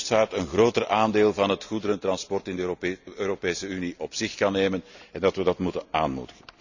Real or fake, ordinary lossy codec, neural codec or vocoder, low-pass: real; none; none; 7.2 kHz